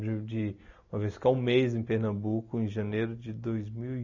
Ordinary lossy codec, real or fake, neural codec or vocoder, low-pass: none; real; none; 7.2 kHz